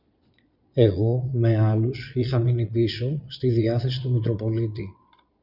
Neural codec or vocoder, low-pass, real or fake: vocoder, 22.05 kHz, 80 mel bands, Vocos; 5.4 kHz; fake